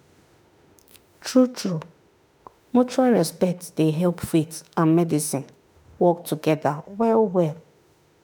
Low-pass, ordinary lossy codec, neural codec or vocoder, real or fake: none; none; autoencoder, 48 kHz, 32 numbers a frame, DAC-VAE, trained on Japanese speech; fake